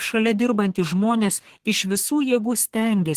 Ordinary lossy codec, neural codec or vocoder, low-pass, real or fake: Opus, 16 kbps; codec, 32 kHz, 1.9 kbps, SNAC; 14.4 kHz; fake